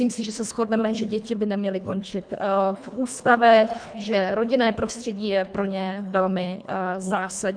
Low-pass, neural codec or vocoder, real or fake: 9.9 kHz; codec, 24 kHz, 1.5 kbps, HILCodec; fake